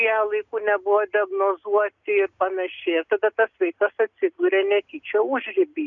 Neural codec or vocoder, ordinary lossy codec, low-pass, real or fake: none; MP3, 96 kbps; 7.2 kHz; real